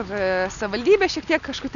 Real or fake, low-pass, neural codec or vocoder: real; 7.2 kHz; none